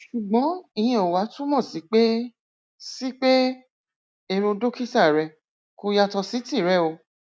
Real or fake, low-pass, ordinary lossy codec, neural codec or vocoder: real; none; none; none